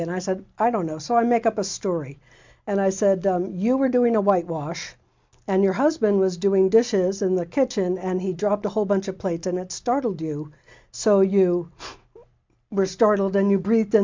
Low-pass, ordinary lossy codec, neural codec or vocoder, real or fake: 7.2 kHz; MP3, 64 kbps; none; real